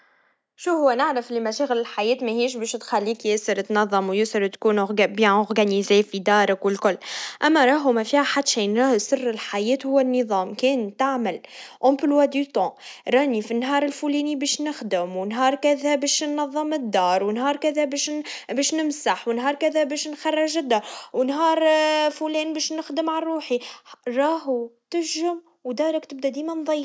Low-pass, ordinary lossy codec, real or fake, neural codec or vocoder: none; none; real; none